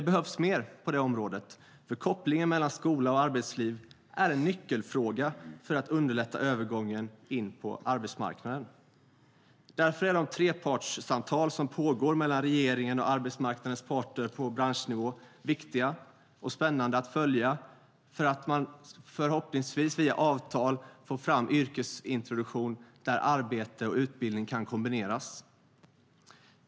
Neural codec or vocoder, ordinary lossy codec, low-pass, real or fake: none; none; none; real